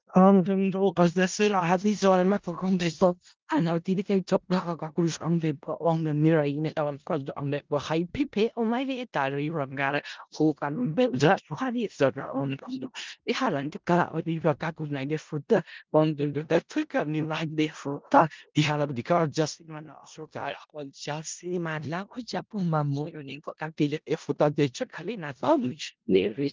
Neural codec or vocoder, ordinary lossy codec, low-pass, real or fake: codec, 16 kHz in and 24 kHz out, 0.4 kbps, LongCat-Audio-Codec, four codebook decoder; Opus, 32 kbps; 7.2 kHz; fake